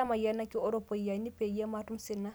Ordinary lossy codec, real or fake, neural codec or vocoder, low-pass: none; real; none; none